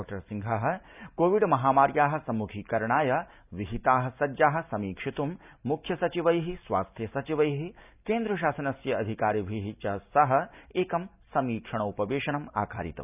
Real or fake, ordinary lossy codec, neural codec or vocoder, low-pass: real; none; none; 3.6 kHz